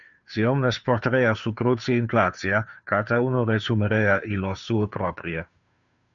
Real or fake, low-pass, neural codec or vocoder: fake; 7.2 kHz; codec, 16 kHz, 2 kbps, FunCodec, trained on Chinese and English, 25 frames a second